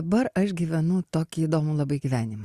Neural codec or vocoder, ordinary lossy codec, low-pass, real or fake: none; Opus, 64 kbps; 14.4 kHz; real